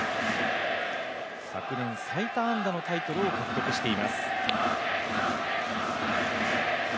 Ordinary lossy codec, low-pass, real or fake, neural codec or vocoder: none; none; real; none